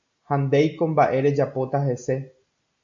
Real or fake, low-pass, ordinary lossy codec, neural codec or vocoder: real; 7.2 kHz; AAC, 64 kbps; none